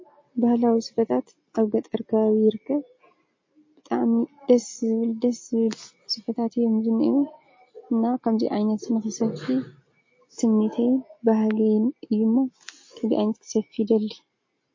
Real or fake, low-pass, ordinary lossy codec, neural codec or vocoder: real; 7.2 kHz; MP3, 32 kbps; none